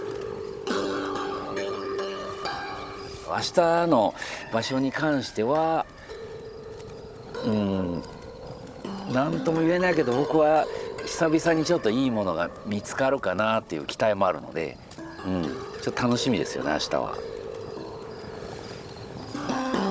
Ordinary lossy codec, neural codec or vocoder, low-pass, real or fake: none; codec, 16 kHz, 16 kbps, FunCodec, trained on Chinese and English, 50 frames a second; none; fake